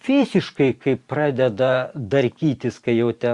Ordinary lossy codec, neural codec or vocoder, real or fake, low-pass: Opus, 64 kbps; vocoder, 44.1 kHz, 128 mel bands every 512 samples, BigVGAN v2; fake; 10.8 kHz